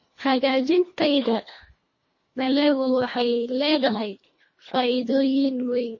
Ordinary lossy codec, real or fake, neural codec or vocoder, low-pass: MP3, 32 kbps; fake; codec, 24 kHz, 1.5 kbps, HILCodec; 7.2 kHz